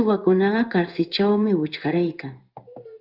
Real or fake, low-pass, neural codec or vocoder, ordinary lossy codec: fake; 5.4 kHz; codec, 16 kHz in and 24 kHz out, 1 kbps, XY-Tokenizer; Opus, 24 kbps